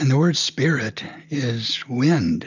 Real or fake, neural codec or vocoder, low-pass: real; none; 7.2 kHz